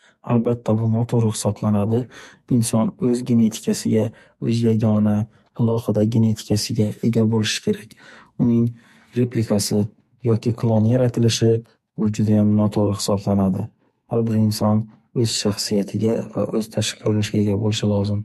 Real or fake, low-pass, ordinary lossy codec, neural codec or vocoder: fake; 14.4 kHz; MP3, 64 kbps; codec, 44.1 kHz, 2.6 kbps, SNAC